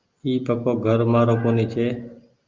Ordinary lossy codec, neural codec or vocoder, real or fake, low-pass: Opus, 24 kbps; none; real; 7.2 kHz